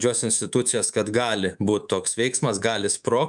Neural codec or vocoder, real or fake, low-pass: codec, 24 kHz, 3.1 kbps, DualCodec; fake; 10.8 kHz